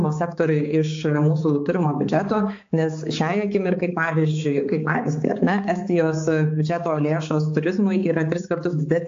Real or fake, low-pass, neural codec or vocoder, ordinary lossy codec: fake; 7.2 kHz; codec, 16 kHz, 4 kbps, X-Codec, HuBERT features, trained on balanced general audio; AAC, 48 kbps